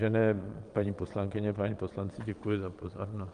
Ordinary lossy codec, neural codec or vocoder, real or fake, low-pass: Opus, 32 kbps; vocoder, 22.05 kHz, 80 mel bands, Vocos; fake; 9.9 kHz